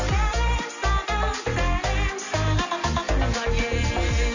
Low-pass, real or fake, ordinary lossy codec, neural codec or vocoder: 7.2 kHz; real; none; none